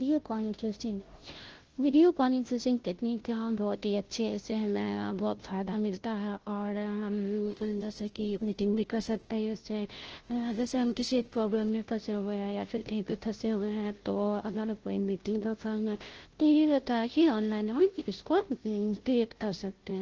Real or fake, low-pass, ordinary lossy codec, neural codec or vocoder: fake; 7.2 kHz; Opus, 16 kbps; codec, 16 kHz, 0.5 kbps, FunCodec, trained on Chinese and English, 25 frames a second